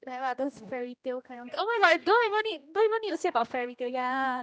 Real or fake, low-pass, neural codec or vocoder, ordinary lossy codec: fake; none; codec, 16 kHz, 1 kbps, X-Codec, HuBERT features, trained on general audio; none